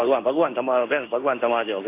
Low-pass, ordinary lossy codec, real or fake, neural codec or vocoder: 3.6 kHz; AAC, 24 kbps; fake; codec, 16 kHz in and 24 kHz out, 1 kbps, XY-Tokenizer